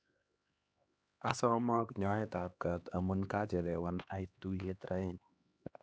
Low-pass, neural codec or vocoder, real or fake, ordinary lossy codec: none; codec, 16 kHz, 2 kbps, X-Codec, HuBERT features, trained on LibriSpeech; fake; none